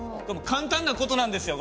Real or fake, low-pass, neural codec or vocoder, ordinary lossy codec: real; none; none; none